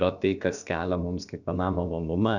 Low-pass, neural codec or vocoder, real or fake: 7.2 kHz; codec, 16 kHz, about 1 kbps, DyCAST, with the encoder's durations; fake